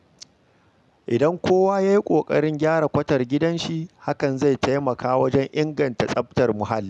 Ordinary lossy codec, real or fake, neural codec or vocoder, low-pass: none; real; none; none